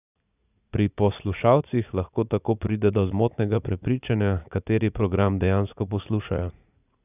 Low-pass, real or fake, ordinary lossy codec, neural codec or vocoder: 3.6 kHz; fake; none; vocoder, 44.1 kHz, 80 mel bands, Vocos